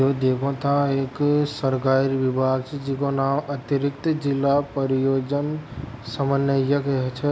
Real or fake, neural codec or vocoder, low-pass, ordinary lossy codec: real; none; none; none